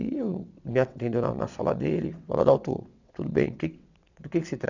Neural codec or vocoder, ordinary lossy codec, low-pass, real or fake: vocoder, 22.05 kHz, 80 mel bands, WaveNeXt; none; 7.2 kHz; fake